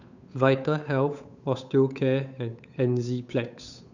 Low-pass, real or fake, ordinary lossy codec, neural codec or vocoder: 7.2 kHz; fake; none; codec, 16 kHz, 8 kbps, FunCodec, trained on Chinese and English, 25 frames a second